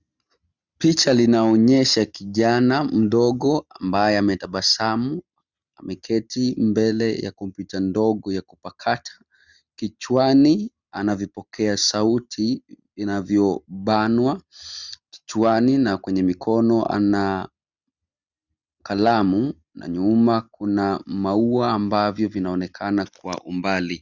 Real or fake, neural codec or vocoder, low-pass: real; none; 7.2 kHz